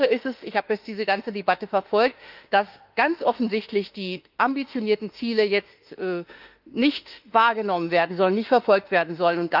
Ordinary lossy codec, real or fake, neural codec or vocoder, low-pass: Opus, 32 kbps; fake; autoencoder, 48 kHz, 32 numbers a frame, DAC-VAE, trained on Japanese speech; 5.4 kHz